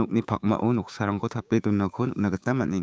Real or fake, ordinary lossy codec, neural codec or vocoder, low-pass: fake; none; codec, 16 kHz, 6 kbps, DAC; none